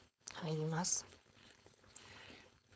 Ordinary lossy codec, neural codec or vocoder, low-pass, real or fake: none; codec, 16 kHz, 4.8 kbps, FACodec; none; fake